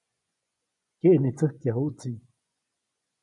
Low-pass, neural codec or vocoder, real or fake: 10.8 kHz; vocoder, 24 kHz, 100 mel bands, Vocos; fake